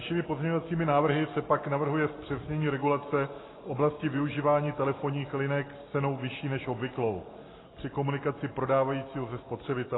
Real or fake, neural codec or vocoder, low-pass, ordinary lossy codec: real; none; 7.2 kHz; AAC, 16 kbps